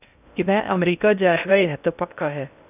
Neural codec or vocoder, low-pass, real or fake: codec, 16 kHz in and 24 kHz out, 0.6 kbps, FocalCodec, streaming, 2048 codes; 3.6 kHz; fake